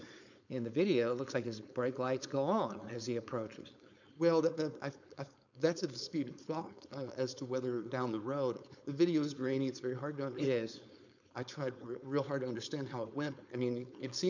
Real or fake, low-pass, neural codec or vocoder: fake; 7.2 kHz; codec, 16 kHz, 4.8 kbps, FACodec